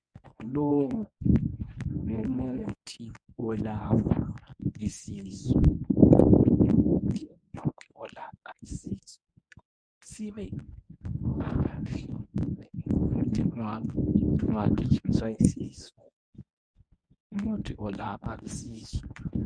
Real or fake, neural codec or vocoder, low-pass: fake; codec, 24 kHz, 0.9 kbps, WavTokenizer, medium speech release version 1; 9.9 kHz